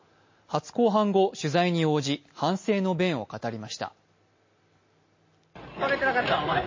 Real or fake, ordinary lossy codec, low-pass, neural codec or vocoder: real; MP3, 32 kbps; 7.2 kHz; none